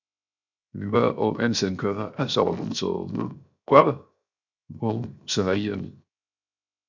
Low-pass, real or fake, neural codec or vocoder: 7.2 kHz; fake; codec, 16 kHz, 0.7 kbps, FocalCodec